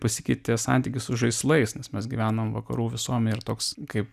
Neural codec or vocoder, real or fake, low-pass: none; real; 14.4 kHz